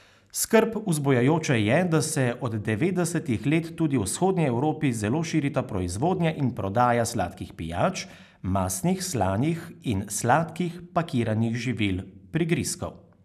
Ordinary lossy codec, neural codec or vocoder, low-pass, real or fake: none; none; 14.4 kHz; real